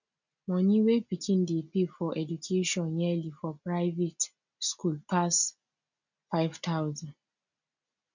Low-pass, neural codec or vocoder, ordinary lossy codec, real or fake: 7.2 kHz; none; none; real